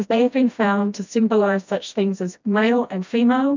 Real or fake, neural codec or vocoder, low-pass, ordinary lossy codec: fake; codec, 16 kHz, 1 kbps, FreqCodec, smaller model; 7.2 kHz; MP3, 64 kbps